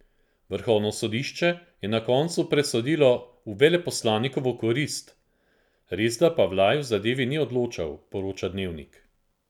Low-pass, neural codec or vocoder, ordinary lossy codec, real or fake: 19.8 kHz; none; none; real